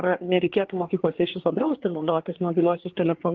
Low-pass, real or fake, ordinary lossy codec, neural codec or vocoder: 7.2 kHz; fake; Opus, 16 kbps; codec, 24 kHz, 1 kbps, SNAC